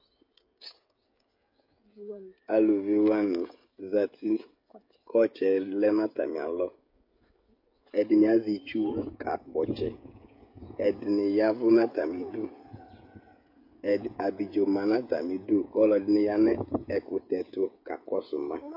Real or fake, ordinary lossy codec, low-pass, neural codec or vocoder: fake; MP3, 32 kbps; 5.4 kHz; codec, 16 kHz, 16 kbps, FreqCodec, smaller model